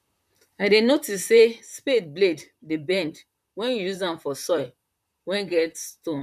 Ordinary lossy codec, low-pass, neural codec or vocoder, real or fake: none; 14.4 kHz; vocoder, 44.1 kHz, 128 mel bands, Pupu-Vocoder; fake